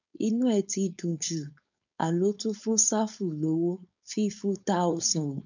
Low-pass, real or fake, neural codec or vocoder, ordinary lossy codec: 7.2 kHz; fake; codec, 16 kHz, 4.8 kbps, FACodec; none